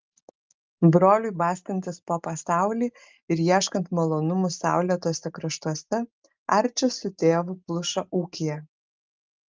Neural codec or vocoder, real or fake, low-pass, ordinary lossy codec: none; real; 7.2 kHz; Opus, 24 kbps